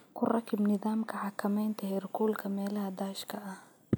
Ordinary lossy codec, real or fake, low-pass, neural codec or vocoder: none; real; none; none